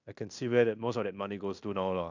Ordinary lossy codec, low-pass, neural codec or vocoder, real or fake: none; 7.2 kHz; codec, 16 kHz in and 24 kHz out, 0.9 kbps, LongCat-Audio-Codec, fine tuned four codebook decoder; fake